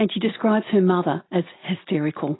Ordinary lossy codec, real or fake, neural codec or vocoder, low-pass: AAC, 16 kbps; real; none; 7.2 kHz